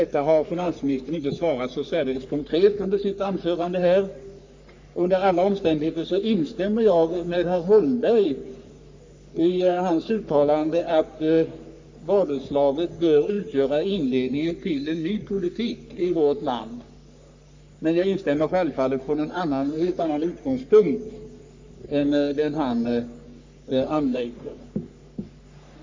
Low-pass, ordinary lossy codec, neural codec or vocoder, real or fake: 7.2 kHz; MP3, 64 kbps; codec, 44.1 kHz, 3.4 kbps, Pupu-Codec; fake